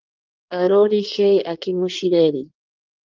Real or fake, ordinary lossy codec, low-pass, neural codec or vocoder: fake; Opus, 32 kbps; 7.2 kHz; codec, 16 kHz in and 24 kHz out, 1.1 kbps, FireRedTTS-2 codec